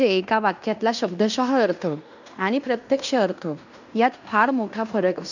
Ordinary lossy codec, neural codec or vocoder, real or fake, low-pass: none; codec, 16 kHz in and 24 kHz out, 0.9 kbps, LongCat-Audio-Codec, fine tuned four codebook decoder; fake; 7.2 kHz